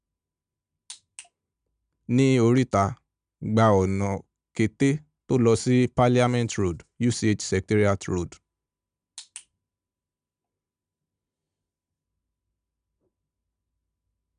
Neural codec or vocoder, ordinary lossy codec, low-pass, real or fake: none; none; 9.9 kHz; real